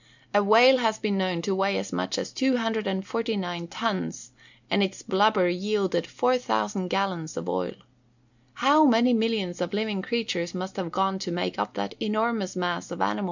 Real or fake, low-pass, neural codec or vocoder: real; 7.2 kHz; none